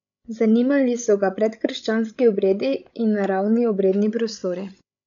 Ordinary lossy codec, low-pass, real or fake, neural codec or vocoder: none; 7.2 kHz; fake; codec, 16 kHz, 16 kbps, FreqCodec, larger model